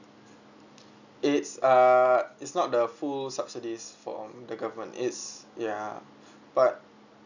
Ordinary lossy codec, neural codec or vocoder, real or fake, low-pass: none; none; real; 7.2 kHz